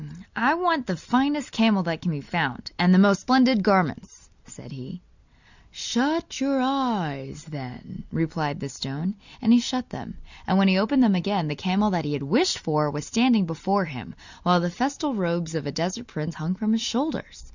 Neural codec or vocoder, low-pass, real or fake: none; 7.2 kHz; real